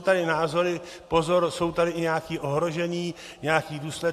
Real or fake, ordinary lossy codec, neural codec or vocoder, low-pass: real; AAC, 64 kbps; none; 14.4 kHz